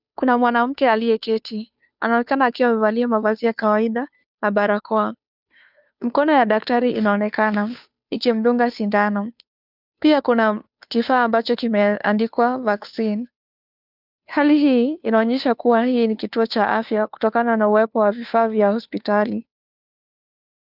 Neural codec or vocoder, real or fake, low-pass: codec, 16 kHz, 2 kbps, FunCodec, trained on Chinese and English, 25 frames a second; fake; 5.4 kHz